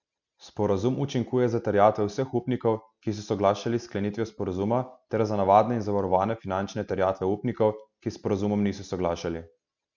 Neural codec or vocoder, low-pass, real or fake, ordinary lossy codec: none; 7.2 kHz; real; none